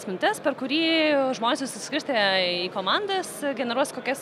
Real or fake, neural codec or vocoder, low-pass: real; none; 14.4 kHz